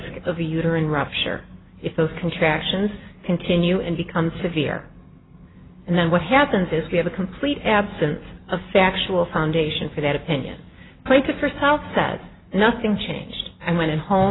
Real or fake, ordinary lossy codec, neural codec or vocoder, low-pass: real; AAC, 16 kbps; none; 7.2 kHz